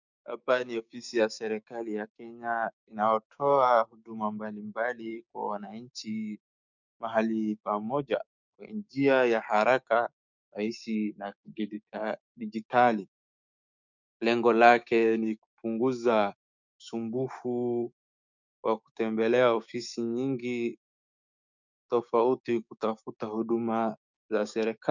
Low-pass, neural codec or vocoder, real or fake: 7.2 kHz; codec, 16 kHz, 6 kbps, DAC; fake